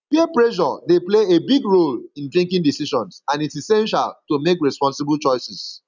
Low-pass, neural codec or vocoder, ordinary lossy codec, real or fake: 7.2 kHz; none; none; real